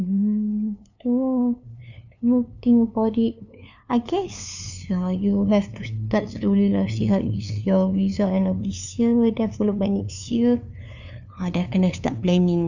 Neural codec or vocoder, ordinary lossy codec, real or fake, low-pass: codec, 16 kHz, 2 kbps, FunCodec, trained on LibriTTS, 25 frames a second; none; fake; 7.2 kHz